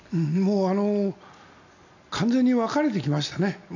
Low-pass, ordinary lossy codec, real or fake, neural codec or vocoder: 7.2 kHz; none; real; none